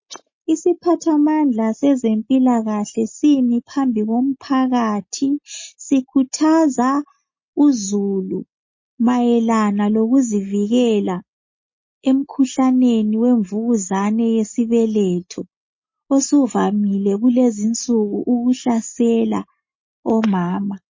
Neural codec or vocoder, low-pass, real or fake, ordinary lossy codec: none; 7.2 kHz; real; MP3, 32 kbps